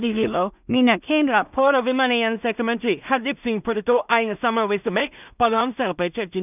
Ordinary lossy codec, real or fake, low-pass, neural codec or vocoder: none; fake; 3.6 kHz; codec, 16 kHz in and 24 kHz out, 0.4 kbps, LongCat-Audio-Codec, two codebook decoder